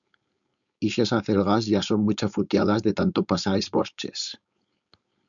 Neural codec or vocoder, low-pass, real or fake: codec, 16 kHz, 4.8 kbps, FACodec; 7.2 kHz; fake